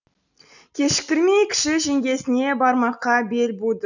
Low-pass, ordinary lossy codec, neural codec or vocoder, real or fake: 7.2 kHz; none; none; real